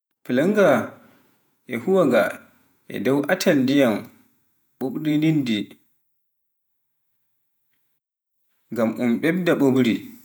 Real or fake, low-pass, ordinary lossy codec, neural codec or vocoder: fake; none; none; vocoder, 48 kHz, 128 mel bands, Vocos